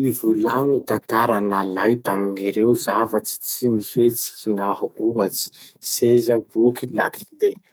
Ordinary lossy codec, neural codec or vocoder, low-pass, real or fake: none; codec, 44.1 kHz, 2.6 kbps, SNAC; none; fake